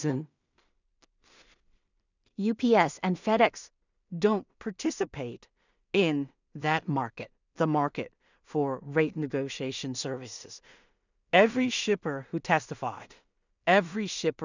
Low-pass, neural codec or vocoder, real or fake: 7.2 kHz; codec, 16 kHz in and 24 kHz out, 0.4 kbps, LongCat-Audio-Codec, two codebook decoder; fake